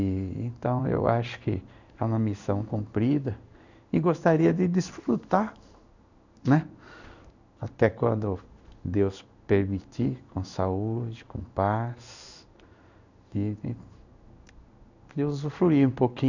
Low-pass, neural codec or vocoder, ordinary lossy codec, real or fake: 7.2 kHz; codec, 16 kHz in and 24 kHz out, 1 kbps, XY-Tokenizer; none; fake